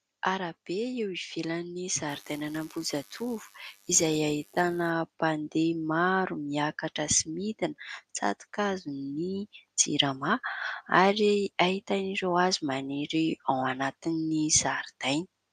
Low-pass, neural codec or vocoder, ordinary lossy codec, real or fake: 14.4 kHz; none; AAC, 96 kbps; real